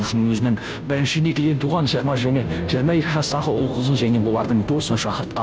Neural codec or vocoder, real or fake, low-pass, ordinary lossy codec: codec, 16 kHz, 0.5 kbps, FunCodec, trained on Chinese and English, 25 frames a second; fake; none; none